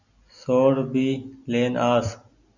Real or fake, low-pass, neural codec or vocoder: real; 7.2 kHz; none